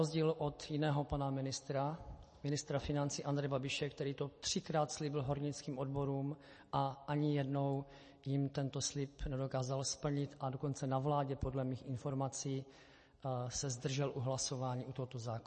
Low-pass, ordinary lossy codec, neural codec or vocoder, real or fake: 10.8 kHz; MP3, 32 kbps; none; real